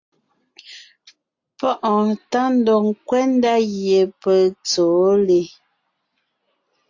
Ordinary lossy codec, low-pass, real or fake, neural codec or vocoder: AAC, 48 kbps; 7.2 kHz; real; none